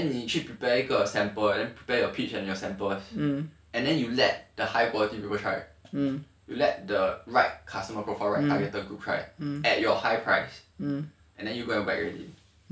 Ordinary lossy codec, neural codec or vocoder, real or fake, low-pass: none; none; real; none